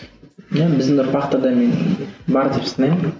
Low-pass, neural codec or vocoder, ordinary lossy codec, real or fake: none; none; none; real